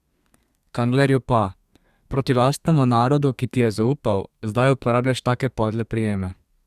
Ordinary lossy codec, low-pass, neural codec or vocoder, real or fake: Opus, 64 kbps; 14.4 kHz; codec, 32 kHz, 1.9 kbps, SNAC; fake